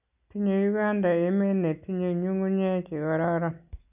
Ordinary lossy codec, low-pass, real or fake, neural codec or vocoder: none; 3.6 kHz; real; none